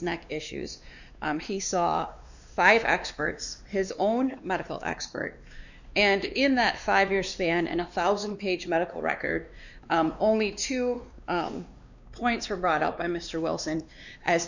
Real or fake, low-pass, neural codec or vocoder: fake; 7.2 kHz; codec, 16 kHz, 2 kbps, X-Codec, WavLM features, trained on Multilingual LibriSpeech